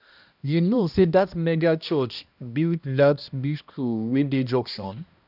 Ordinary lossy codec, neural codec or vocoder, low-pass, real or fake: none; codec, 16 kHz, 1 kbps, X-Codec, HuBERT features, trained on balanced general audio; 5.4 kHz; fake